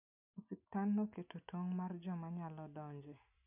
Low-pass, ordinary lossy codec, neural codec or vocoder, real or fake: 3.6 kHz; none; none; real